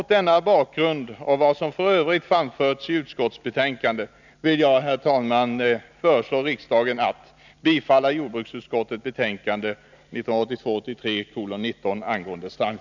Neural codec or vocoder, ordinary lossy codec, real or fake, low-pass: none; none; real; 7.2 kHz